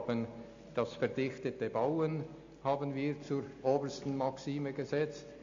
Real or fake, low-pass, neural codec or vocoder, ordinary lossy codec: real; 7.2 kHz; none; AAC, 48 kbps